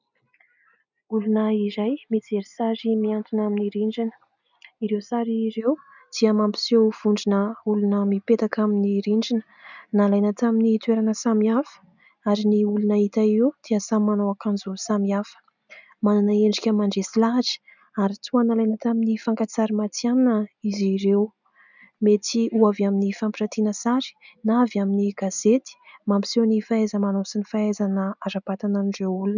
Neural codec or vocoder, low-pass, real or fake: none; 7.2 kHz; real